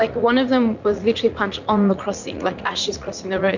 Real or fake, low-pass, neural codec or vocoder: fake; 7.2 kHz; vocoder, 44.1 kHz, 80 mel bands, Vocos